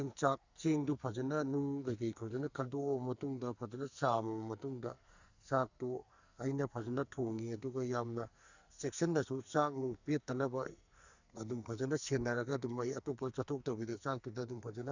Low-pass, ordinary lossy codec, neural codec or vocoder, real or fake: 7.2 kHz; Opus, 64 kbps; codec, 44.1 kHz, 2.6 kbps, SNAC; fake